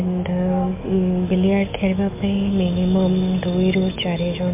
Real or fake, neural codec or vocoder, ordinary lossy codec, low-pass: fake; autoencoder, 48 kHz, 128 numbers a frame, DAC-VAE, trained on Japanese speech; MP3, 32 kbps; 3.6 kHz